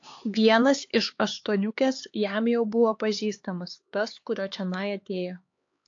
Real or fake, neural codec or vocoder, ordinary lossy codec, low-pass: fake; codec, 16 kHz, 4 kbps, X-Codec, HuBERT features, trained on LibriSpeech; AAC, 48 kbps; 7.2 kHz